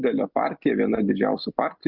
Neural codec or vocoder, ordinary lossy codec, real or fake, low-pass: none; Opus, 64 kbps; real; 5.4 kHz